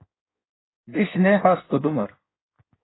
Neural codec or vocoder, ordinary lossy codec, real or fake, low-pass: codec, 16 kHz in and 24 kHz out, 1.1 kbps, FireRedTTS-2 codec; AAC, 16 kbps; fake; 7.2 kHz